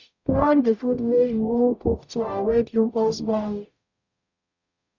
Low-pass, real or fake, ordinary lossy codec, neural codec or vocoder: 7.2 kHz; fake; none; codec, 44.1 kHz, 0.9 kbps, DAC